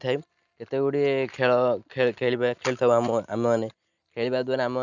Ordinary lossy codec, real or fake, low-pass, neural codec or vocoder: none; real; 7.2 kHz; none